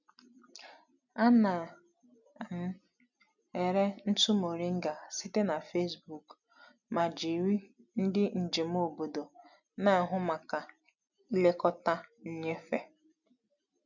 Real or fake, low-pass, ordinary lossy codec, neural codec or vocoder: real; 7.2 kHz; none; none